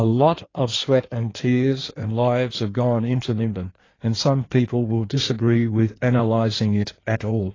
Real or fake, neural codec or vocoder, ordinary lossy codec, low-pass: fake; codec, 16 kHz in and 24 kHz out, 1.1 kbps, FireRedTTS-2 codec; AAC, 32 kbps; 7.2 kHz